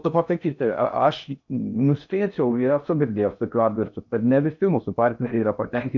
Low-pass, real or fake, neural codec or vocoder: 7.2 kHz; fake; codec, 16 kHz in and 24 kHz out, 0.6 kbps, FocalCodec, streaming, 2048 codes